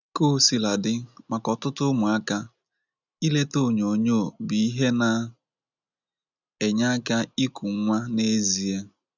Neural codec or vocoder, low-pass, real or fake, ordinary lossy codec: none; 7.2 kHz; real; none